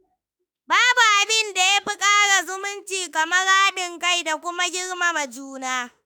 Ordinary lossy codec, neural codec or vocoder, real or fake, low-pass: none; autoencoder, 48 kHz, 32 numbers a frame, DAC-VAE, trained on Japanese speech; fake; none